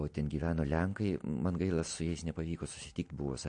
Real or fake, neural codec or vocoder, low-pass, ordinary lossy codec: real; none; 9.9 kHz; MP3, 48 kbps